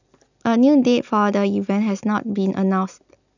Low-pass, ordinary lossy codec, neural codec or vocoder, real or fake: 7.2 kHz; none; none; real